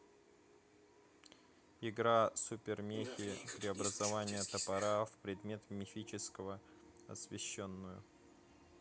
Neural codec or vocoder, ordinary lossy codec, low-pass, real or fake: none; none; none; real